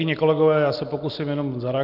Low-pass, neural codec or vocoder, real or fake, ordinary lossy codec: 5.4 kHz; none; real; Opus, 24 kbps